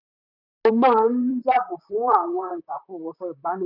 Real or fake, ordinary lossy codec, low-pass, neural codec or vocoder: fake; none; 5.4 kHz; vocoder, 44.1 kHz, 128 mel bands, Pupu-Vocoder